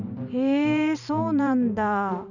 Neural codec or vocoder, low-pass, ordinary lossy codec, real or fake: vocoder, 44.1 kHz, 128 mel bands every 512 samples, BigVGAN v2; 7.2 kHz; none; fake